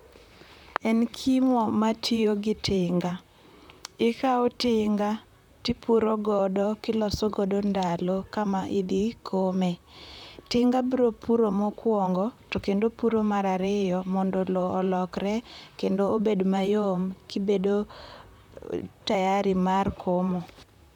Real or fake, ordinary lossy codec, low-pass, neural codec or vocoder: fake; none; 19.8 kHz; vocoder, 44.1 kHz, 128 mel bands, Pupu-Vocoder